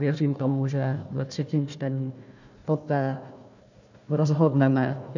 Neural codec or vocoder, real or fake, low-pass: codec, 16 kHz, 1 kbps, FunCodec, trained on Chinese and English, 50 frames a second; fake; 7.2 kHz